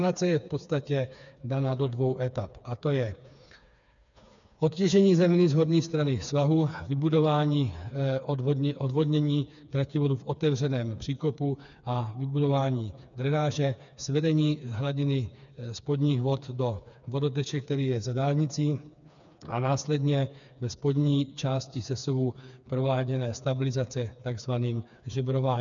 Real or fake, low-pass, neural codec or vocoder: fake; 7.2 kHz; codec, 16 kHz, 4 kbps, FreqCodec, smaller model